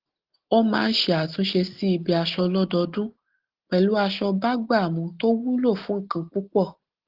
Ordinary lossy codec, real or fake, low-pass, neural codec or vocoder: Opus, 16 kbps; real; 5.4 kHz; none